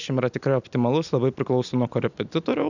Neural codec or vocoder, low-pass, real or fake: none; 7.2 kHz; real